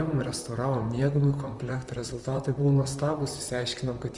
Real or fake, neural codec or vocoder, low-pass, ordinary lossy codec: fake; vocoder, 44.1 kHz, 128 mel bands, Pupu-Vocoder; 10.8 kHz; Opus, 32 kbps